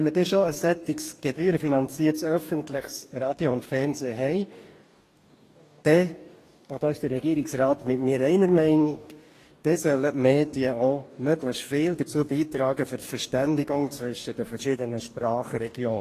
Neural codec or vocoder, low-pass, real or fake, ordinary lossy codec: codec, 44.1 kHz, 2.6 kbps, DAC; 14.4 kHz; fake; AAC, 48 kbps